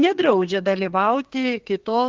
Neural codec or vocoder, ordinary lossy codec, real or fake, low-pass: codec, 32 kHz, 1.9 kbps, SNAC; Opus, 16 kbps; fake; 7.2 kHz